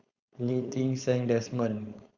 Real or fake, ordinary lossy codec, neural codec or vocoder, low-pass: fake; Opus, 64 kbps; codec, 16 kHz, 4.8 kbps, FACodec; 7.2 kHz